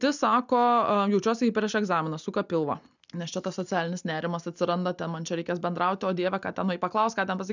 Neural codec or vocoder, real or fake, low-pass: none; real; 7.2 kHz